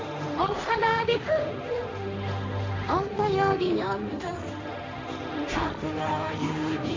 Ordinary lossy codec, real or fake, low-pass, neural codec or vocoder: MP3, 48 kbps; fake; 7.2 kHz; codec, 16 kHz, 1.1 kbps, Voila-Tokenizer